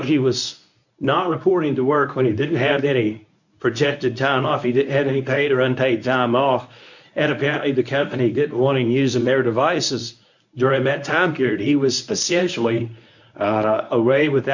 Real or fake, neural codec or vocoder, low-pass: fake; codec, 24 kHz, 0.9 kbps, WavTokenizer, medium speech release version 1; 7.2 kHz